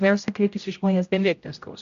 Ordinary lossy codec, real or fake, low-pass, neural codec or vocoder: MP3, 64 kbps; fake; 7.2 kHz; codec, 16 kHz, 0.5 kbps, X-Codec, HuBERT features, trained on general audio